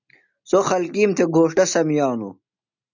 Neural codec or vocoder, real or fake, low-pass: none; real; 7.2 kHz